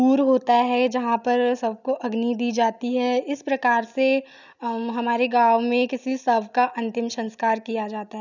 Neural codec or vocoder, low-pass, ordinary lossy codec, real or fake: none; 7.2 kHz; none; real